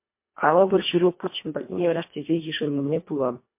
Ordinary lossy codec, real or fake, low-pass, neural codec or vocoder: MP3, 24 kbps; fake; 3.6 kHz; codec, 24 kHz, 1.5 kbps, HILCodec